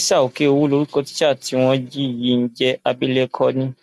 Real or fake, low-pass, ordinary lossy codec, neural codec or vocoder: real; 14.4 kHz; none; none